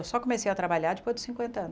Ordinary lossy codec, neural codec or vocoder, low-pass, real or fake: none; none; none; real